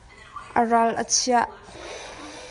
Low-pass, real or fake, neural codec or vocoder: 10.8 kHz; fake; vocoder, 24 kHz, 100 mel bands, Vocos